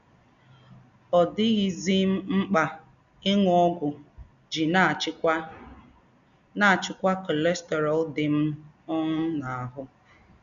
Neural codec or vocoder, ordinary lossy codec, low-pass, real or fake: none; none; 7.2 kHz; real